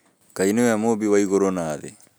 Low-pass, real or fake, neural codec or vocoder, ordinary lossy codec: none; real; none; none